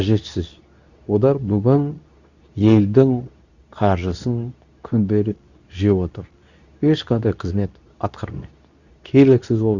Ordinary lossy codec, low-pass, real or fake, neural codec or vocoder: none; 7.2 kHz; fake; codec, 24 kHz, 0.9 kbps, WavTokenizer, medium speech release version 2